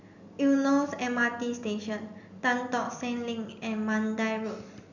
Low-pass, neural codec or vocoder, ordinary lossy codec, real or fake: 7.2 kHz; none; none; real